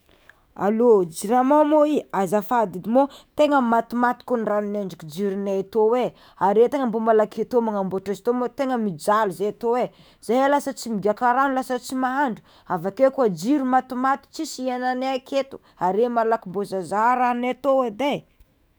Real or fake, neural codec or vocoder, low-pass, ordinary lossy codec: fake; autoencoder, 48 kHz, 128 numbers a frame, DAC-VAE, trained on Japanese speech; none; none